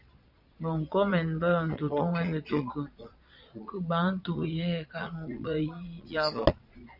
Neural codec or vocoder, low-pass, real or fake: vocoder, 22.05 kHz, 80 mel bands, Vocos; 5.4 kHz; fake